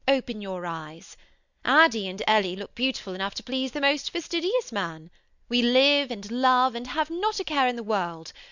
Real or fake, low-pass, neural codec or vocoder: real; 7.2 kHz; none